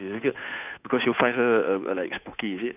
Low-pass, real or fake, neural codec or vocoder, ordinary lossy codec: 3.6 kHz; fake; codec, 16 kHz, 2 kbps, FunCodec, trained on Chinese and English, 25 frames a second; none